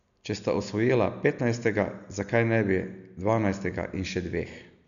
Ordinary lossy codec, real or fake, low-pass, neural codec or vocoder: none; real; 7.2 kHz; none